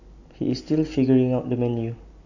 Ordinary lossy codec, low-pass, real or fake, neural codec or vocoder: none; 7.2 kHz; real; none